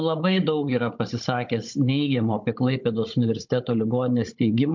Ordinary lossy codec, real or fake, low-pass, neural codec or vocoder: MP3, 48 kbps; fake; 7.2 kHz; codec, 16 kHz, 16 kbps, FunCodec, trained on Chinese and English, 50 frames a second